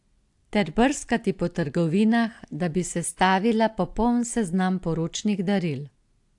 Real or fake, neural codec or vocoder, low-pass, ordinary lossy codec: real; none; 10.8 kHz; AAC, 64 kbps